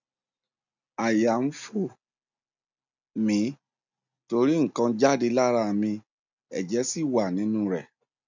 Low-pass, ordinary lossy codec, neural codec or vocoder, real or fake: 7.2 kHz; none; none; real